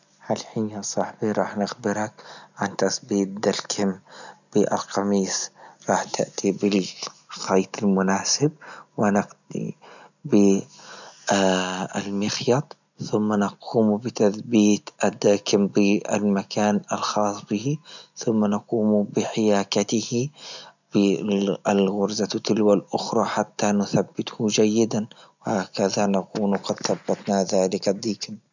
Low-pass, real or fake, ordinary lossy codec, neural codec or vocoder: 7.2 kHz; real; none; none